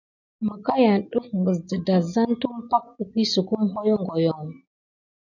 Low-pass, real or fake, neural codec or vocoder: 7.2 kHz; real; none